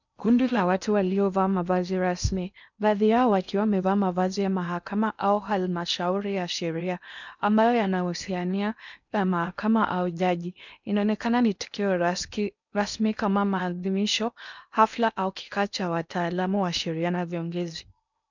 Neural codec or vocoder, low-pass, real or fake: codec, 16 kHz in and 24 kHz out, 0.8 kbps, FocalCodec, streaming, 65536 codes; 7.2 kHz; fake